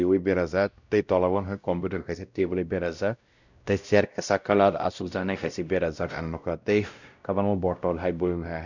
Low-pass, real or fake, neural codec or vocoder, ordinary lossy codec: 7.2 kHz; fake; codec, 16 kHz, 0.5 kbps, X-Codec, WavLM features, trained on Multilingual LibriSpeech; none